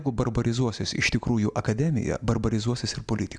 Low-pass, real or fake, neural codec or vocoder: 9.9 kHz; real; none